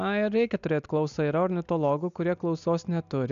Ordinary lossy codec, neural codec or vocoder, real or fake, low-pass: AAC, 96 kbps; none; real; 7.2 kHz